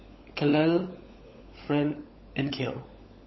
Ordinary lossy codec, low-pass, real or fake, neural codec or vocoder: MP3, 24 kbps; 7.2 kHz; fake; codec, 16 kHz, 16 kbps, FunCodec, trained on LibriTTS, 50 frames a second